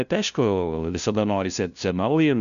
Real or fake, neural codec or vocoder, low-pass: fake; codec, 16 kHz, 0.5 kbps, FunCodec, trained on LibriTTS, 25 frames a second; 7.2 kHz